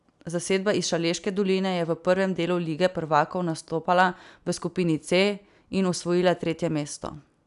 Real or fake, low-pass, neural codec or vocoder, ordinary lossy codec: real; 10.8 kHz; none; none